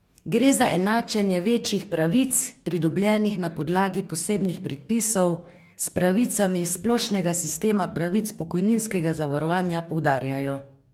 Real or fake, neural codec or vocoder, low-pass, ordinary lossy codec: fake; codec, 44.1 kHz, 2.6 kbps, DAC; 19.8 kHz; MP3, 96 kbps